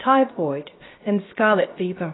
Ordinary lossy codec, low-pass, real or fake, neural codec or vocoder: AAC, 16 kbps; 7.2 kHz; fake; codec, 16 kHz, 0.7 kbps, FocalCodec